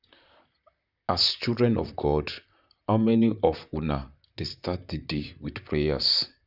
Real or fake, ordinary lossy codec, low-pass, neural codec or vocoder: fake; none; 5.4 kHz; vocoder, 44.1 kHz, 128 mel bands, Pupu-Vocoder